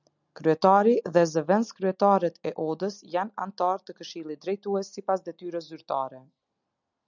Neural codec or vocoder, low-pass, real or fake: none; 7.2 kHz; real